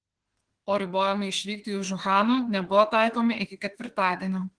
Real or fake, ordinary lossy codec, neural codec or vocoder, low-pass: fake; Opus, 16 kbps; autoencoder, 48 kHz, 32 numbers a frame, DAC-VAE, trained on Japanese speech; 9.9 kHz